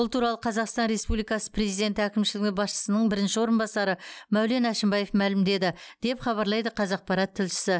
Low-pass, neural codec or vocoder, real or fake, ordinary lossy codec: none; none; real; none